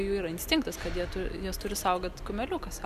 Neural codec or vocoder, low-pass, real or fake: none; 14.4 kHz; real